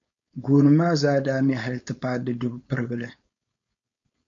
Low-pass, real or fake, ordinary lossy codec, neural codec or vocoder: 7.2 kHz; fake; MP3, 48 kbps; codec, 16 kHz, 4.8 kbps, FACodec